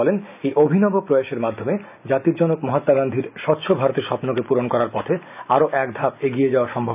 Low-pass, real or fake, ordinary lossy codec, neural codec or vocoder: 3.6 kHz; real; none; none